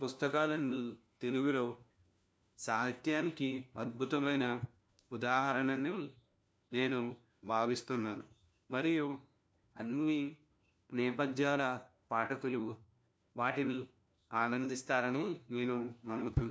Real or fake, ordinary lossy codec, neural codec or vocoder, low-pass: fake; none; codec, 16 kHz, 1 kbps, FunCodec, trained on LibriTTS, 50 frames a second; none